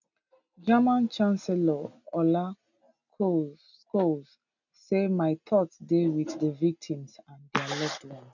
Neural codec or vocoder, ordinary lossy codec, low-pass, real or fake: none; none; 7.2 kHz; real